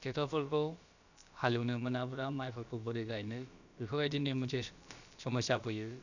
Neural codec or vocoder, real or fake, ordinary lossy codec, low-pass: codec, 16 kHz, about 1 kbps, DyCAST, with the encoder's durations; fake; none; 7.2 kHz